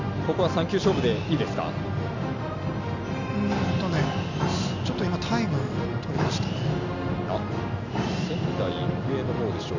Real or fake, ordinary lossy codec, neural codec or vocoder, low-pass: real; none; none; 7.2 kHz